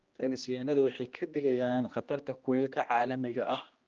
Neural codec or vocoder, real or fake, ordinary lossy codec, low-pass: codec, 16 kHz, 1 kbps, X-Codec, HuBERT features, trained on general audio; fake; Opus, 24 kbps; 7.2 kHz